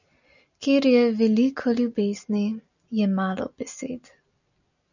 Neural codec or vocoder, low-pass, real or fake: none; 7.2 kHz; real